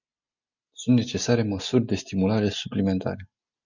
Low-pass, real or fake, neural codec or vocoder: 7.2 kHz; real; none